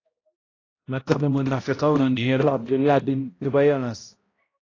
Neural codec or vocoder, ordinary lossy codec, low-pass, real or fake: codec, 16 kHz, 0.5 kbps, X-Codec, HuBERT features, trained on balanced general audio; AAC, 32 kbps; 7.2 kHz; fake